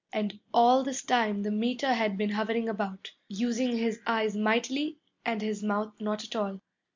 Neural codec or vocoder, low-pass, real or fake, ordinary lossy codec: none; 7.2 kHz; real; MP3, 64 kbps